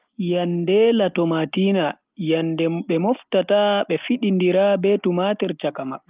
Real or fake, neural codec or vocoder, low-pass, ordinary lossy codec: real; none; 3.6 kHz; Opus, 24 kbps